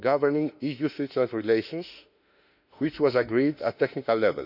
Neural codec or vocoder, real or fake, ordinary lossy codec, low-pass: autoencoder, 48 kHz, 32 numbers a frame, DAC-VAE, trained on Japanese speech; fake; none; 5.4 kHz